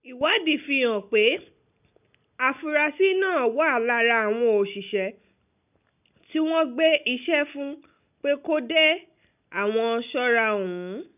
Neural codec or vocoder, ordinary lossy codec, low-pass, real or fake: none; none; 3.6 kHz; real